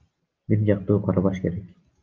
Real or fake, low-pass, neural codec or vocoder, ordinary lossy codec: real; 7.2 kHz; none; Opus, 24 kbps